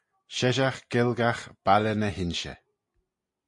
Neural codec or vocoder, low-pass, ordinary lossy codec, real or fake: none; 10.8 kHz; MP3, 48 kbps; real